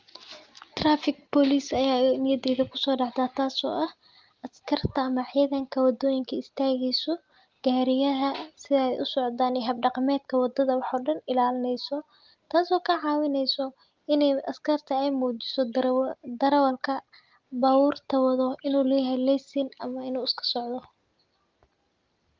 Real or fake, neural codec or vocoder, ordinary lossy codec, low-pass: real; none; Opus, 32 kbps; 7.2 kHz